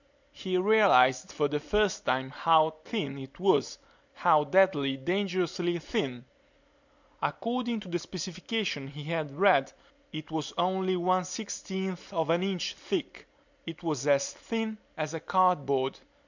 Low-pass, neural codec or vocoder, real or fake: 7.2 kHz; none; real